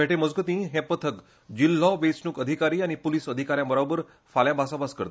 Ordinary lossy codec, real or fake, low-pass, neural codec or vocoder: none; real; none; none